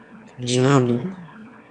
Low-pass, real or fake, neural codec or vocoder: 9.9 kHz; fake; autoencoder, 22.05 kHz, a latent of 192 numbers a frame, VITS, trained on one speaker